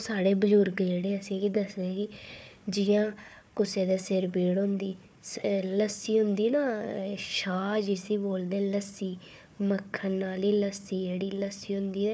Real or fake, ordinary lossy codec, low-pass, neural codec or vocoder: fake; none; none; codec, 16 kHz, 4 kbps, FunCodec, trained on Chinese and English, 50 frames a second